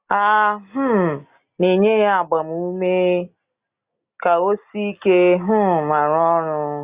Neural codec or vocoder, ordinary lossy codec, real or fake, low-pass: none; Opus, 64 kbps; real; 3.6 kHz